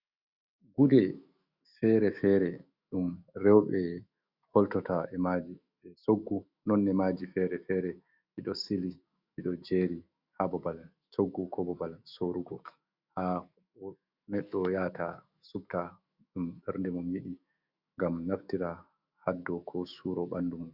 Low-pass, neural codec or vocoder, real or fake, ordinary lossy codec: 5.4 kHz; autoencoder, 48 kHz, 128 numbers a frame, DAC-VAE, trained on Japanese speech; fake; Opus, 64 kbps